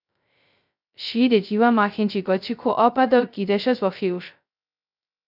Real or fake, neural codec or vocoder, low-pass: fake; codec, 16 kHz, 0.2 kbps, FocalCodec; 5.4 kHz